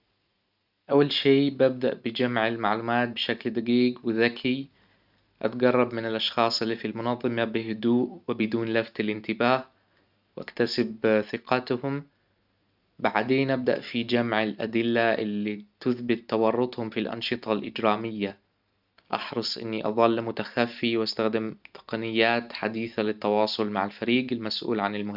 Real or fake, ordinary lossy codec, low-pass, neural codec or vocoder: real; none; 5.4 kHz; none